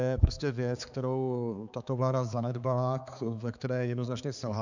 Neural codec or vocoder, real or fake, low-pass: codec, 16 kHz, 4 kbps, X-Codec, HuBERT features, trained on balanced general audio; fake; 7.2 kHz